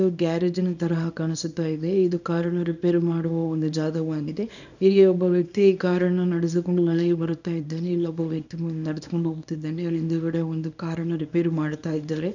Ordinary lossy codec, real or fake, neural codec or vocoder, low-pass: none; fake; codec, 24 kHz, 0.9 kbps, WavTokenizer, small release; 7.2 kHz